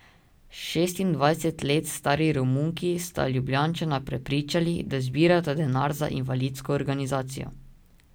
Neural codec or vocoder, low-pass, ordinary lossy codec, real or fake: none; none; none; real